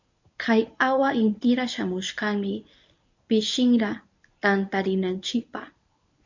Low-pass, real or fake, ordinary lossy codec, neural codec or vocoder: 7.2 kHz; fake; MP3, 64 kbps; codec, 24 kHz, 0.9 kbps, WavTokenizer, medium speech release version 1